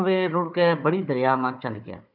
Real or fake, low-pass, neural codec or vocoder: fake; 5.4 kHz; codec, 16 kHz, 4 kbps, FunCodec, trained on Chinese and English, 50 frames a second